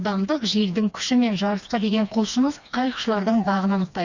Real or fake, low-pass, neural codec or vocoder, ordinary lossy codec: fake; 7.2 kHz; codec, 16 kHz, 2 kbps, FreqCodec, smaller model; Opus, 64 kbps